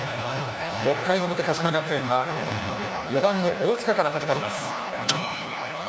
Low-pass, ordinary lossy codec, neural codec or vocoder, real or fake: none; none; codec, 16 kHz, 1 kbps, FunCodec, trained on LibriTTS, 50 frames a second; fake